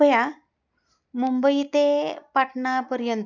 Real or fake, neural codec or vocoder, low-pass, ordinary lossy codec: real; none; 7.2 kHz; none